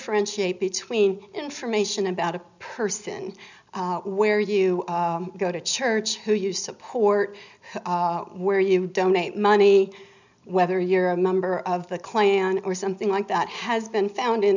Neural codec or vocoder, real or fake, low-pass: none; real; 7.2 kHz